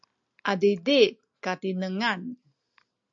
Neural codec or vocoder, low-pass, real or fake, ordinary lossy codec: none; 7.2 kHz; real; AAC, 64 kbps